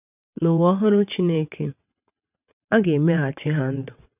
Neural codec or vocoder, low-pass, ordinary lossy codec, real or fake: vocoder, 22.05 kHz, 80 mel bands, WaveNeXt; 3.6 kHz; none; fake